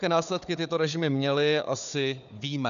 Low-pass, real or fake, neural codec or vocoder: 7.2 kHz; fake; codec, 16 kHz, 4 kbps, FunCodec, trained on Chinese and English, 50 frames a second